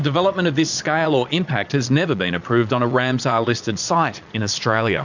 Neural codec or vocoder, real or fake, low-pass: vocoder, 22.05 kHz, 80 mel bands, Vocos; fake; 7.2 kHz